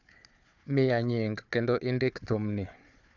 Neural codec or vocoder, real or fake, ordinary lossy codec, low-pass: codec, 16 kHz, 4 kbps, FunCodec, trained on Chinese and English, 50 frames a second; fake; none; 7.2 kHz